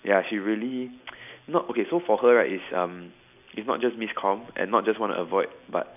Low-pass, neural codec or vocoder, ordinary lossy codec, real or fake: 3.6 kHz; none; none; real